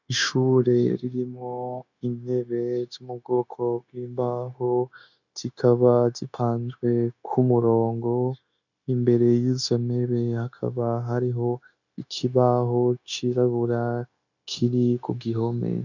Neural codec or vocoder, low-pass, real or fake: codec, 16 kHz, 0.9 kbps, LongCat-Audio-Codec; 7.2 kHz; fake